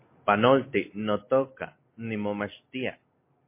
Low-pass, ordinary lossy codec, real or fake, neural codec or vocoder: 3.6 kHz; MP3, 24 kbps; fake; vocoder, 44.1 kHz, 128 mel bands every 256 samples, BigVGAN v2